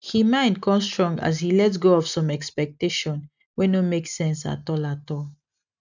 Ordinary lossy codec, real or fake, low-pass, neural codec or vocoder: none; real; 7.2 kHz; none